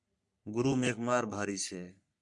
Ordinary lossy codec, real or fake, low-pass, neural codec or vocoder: MP3, 96 kbps; fake; 10.8 kHz; codec, 44.1 kHz, 3.4 kbps, Pupu-Codec